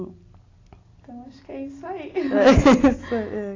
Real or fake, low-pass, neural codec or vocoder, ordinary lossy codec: real; 7.2 kHz; none; AAC, 32 kbps